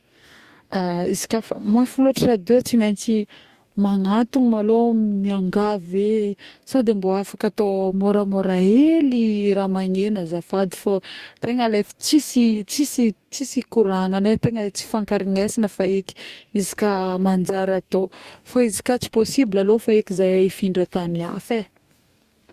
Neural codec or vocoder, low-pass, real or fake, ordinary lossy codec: codec, 44.1 kHz, 2.6 kbps, DAC; 14.4 kHz; fake; Opus, 64 kbps